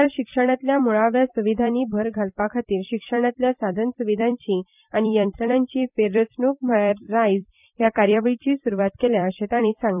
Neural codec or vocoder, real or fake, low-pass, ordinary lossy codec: vocoder, 44.1 kHz, 128 mel bands every 256 samples, BigVGAN v2; fake; 3.6 kHz; none